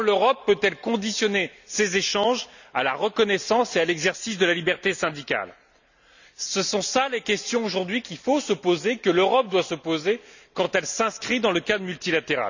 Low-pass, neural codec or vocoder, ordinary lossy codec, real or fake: 7.2 kHz; none; none; real